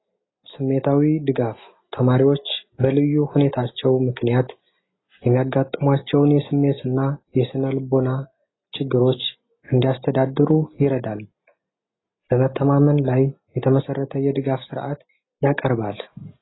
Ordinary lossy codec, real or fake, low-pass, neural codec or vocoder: AAC, 16 kbps; real; 7.2 kHz; none